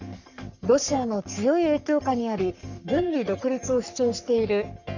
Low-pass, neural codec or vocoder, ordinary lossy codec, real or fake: 7.2 kHz; codec, 44.1 kHz, 3.4 kbps, Pupu-Codec; none; fake